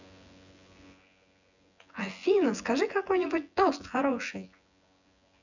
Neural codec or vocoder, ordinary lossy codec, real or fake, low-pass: vocoder, 24 kHz, 100 mel bands, Vocos; none; fake; 7.2 kHz